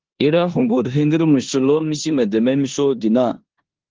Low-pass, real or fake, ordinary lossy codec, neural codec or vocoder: 7.2 kHz; fake; Opus, 16 kbps; codec, 16 kHz in and 24 kHz out, 0.9 kbps, LongCat-Audio-Codec, four codebook decoder